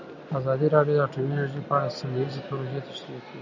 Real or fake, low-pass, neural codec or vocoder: real; 7.2 kHz; none